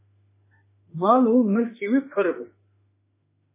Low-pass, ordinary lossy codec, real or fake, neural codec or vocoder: 3.6 kHz; MP3, 16 kbps; fake; autoencoder, 48 kHz, 32 numbers a frame, DAC-VAE, trained on Japanese speech